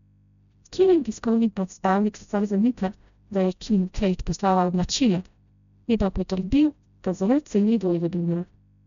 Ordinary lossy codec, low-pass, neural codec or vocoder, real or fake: none; 7.2 kHz; codec, 16 kHz, 0.5 kbps, FreqCodec, smaller model; fake